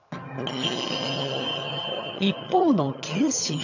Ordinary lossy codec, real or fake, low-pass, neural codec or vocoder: none; fake; 7.2 kHz; vocoder, 22.05 kHz, 80 mel bands, HiFi-GAN